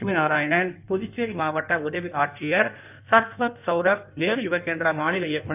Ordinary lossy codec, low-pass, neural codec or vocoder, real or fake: none; 3.6 kHz; codec, 16 kHz in and 24 kHz out, 1.1 kbps, FireRedTTS-2 codec; fake